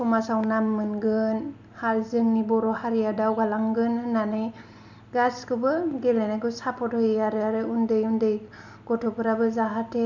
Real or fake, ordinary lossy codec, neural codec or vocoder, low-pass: real; none; none; 7.2 kHz